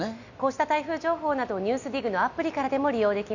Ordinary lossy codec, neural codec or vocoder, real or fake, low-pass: none; none; real; 7.2 kHz